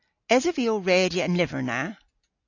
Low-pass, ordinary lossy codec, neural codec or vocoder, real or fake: 7.2 kHz; AAC, 48 kbps; none; real